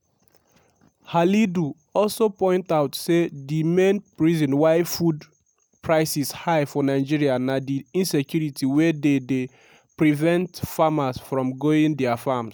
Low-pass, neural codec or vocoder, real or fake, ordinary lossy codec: none; none; real; none